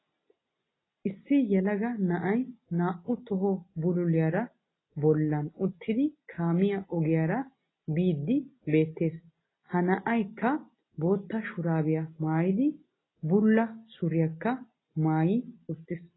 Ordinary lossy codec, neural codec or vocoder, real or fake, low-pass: AAC, 16 kbps; none; real; 7.2 kHz